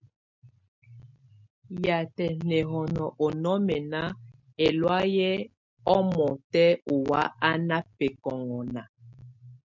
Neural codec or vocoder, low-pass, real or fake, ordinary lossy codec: none; 7.2 kHz; real; MP3, 64 kbps